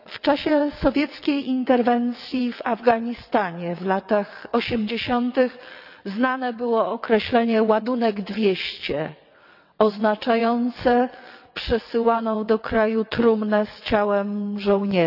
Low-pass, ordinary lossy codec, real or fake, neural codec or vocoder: 5.4 kHz; none; fake; vocoder, 22.05 kHz, 80 mel bands, WaveNeXt